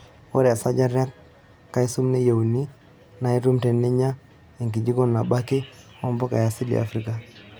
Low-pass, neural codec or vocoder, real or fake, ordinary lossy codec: none; none; real; none